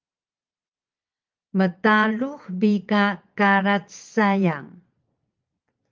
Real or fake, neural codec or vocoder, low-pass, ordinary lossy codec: fake; vocoder, 22.05 kHz, 80 mel bands, Vocos; 7.2 kHz; Opus, 24 kbps